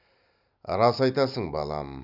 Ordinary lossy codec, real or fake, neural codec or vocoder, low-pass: none; real; none; 5.4 kHz